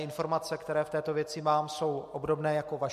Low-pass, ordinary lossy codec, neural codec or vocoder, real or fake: 14.4 kHz; MP3, 64 kbps; none; real